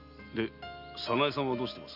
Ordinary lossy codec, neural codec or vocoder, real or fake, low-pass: none; none; real; 5.4 kHz